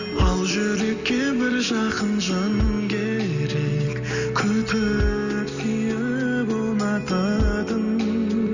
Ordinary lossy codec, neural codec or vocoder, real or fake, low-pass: none; none; real; 7.2 kHz